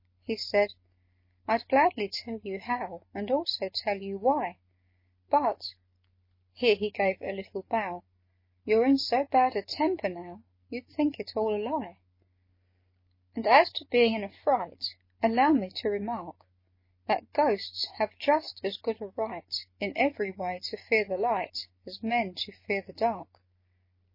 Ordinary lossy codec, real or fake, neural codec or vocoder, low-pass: MP3, 24 kbps; real; none; 5.4 kHz